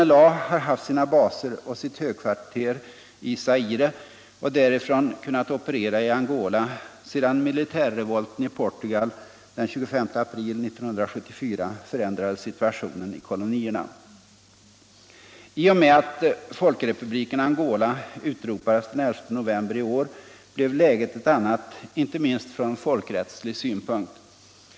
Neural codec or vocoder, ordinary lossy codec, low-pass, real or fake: none; none; none; real